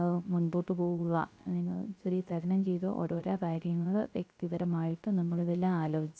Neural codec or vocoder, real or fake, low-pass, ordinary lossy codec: codec, 16 kHz, 0.3 kbps, FocalCodec; fake; none; none